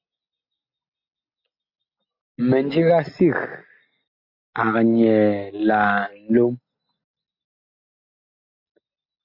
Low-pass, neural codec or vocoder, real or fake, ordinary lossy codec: 5.4 kHz; none; real; MP3, 48 kbps